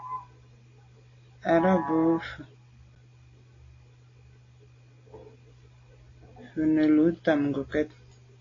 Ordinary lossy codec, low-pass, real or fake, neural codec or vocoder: AAC, 32 kbps; 7.2 kHz; real; none